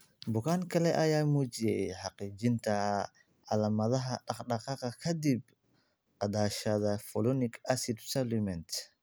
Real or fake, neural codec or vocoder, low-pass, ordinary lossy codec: real; none; none; none